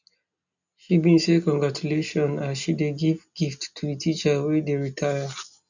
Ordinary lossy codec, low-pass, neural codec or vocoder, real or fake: none; 7.2 kHz; none; real